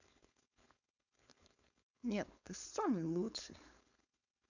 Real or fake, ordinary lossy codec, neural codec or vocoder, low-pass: fake; none; codec, 16 kHz, 4.8 kbps, FACodec; 7.2 kHz